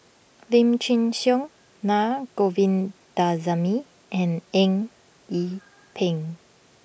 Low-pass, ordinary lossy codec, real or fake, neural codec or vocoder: none; none; real; none